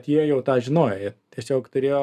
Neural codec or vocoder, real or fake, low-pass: none; real; 14.4 kHz